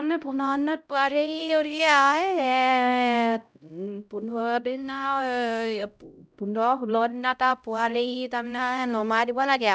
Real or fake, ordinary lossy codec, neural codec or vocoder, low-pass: fake; none; codec, 16 kHz, 0.5 kbps, X-Codec, HuBERT features, trained on LibriSpeech; none